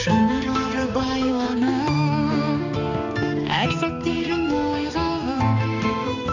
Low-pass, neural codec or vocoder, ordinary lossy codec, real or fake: 7.2 kHz; codec, 16 kHz, 2 kbps, X-Codec, HuBERT features, trained on balanced general audio; MP3, 48 kbps; fake